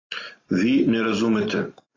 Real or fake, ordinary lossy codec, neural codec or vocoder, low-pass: real; AAC, 32 kbps; none; 7.2 kHz